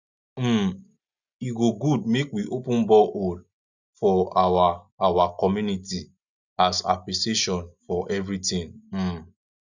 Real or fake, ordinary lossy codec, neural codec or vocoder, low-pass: real; none; none; 7.2 kHz